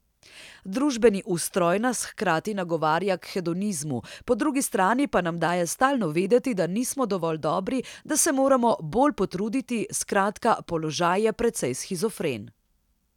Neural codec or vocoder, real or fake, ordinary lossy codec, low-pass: none; real; none; 19.8 kHz